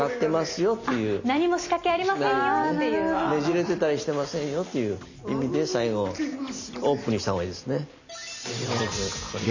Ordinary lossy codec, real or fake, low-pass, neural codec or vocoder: none; real; 7.2 kHz; none